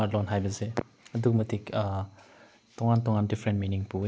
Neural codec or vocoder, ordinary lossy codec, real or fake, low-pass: none; none; real; none